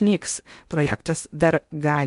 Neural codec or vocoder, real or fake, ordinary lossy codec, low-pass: codec, 16 kHz in and 24 kHz out, 0.8 kbps, FocalCodec, streaming, 65536 codes; fake; MP3, 64 kbps; 10.8 kHz